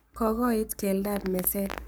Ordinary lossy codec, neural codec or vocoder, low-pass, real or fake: none; codec, 44.1 kHz, 7.8 kbps, Pupu-Codec; none; fake